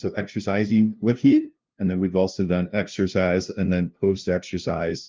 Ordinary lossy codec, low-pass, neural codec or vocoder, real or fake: Opus, 32 kbps; 7.2 kHz; codec, 16 kHz, 0.5 kbps, FunCodec, trained on LibriTTS, 25 frames a second; fake